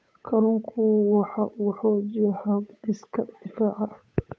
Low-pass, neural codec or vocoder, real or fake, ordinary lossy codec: none; codec, 16 kHz, 8 kbps, FunCodec, trained on Chinese and English, 25 frames a second; fake; none